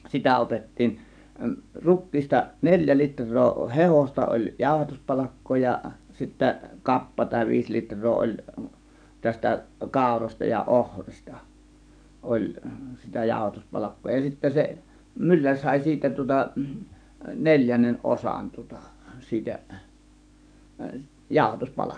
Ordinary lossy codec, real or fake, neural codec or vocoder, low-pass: none; fake; codec, 44.1 kHz, 7.8 kbps, DAC; 9.9 kHz